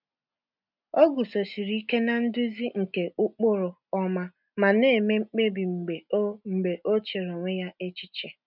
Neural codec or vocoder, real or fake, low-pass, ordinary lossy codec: none; real; 5.4 kHz; none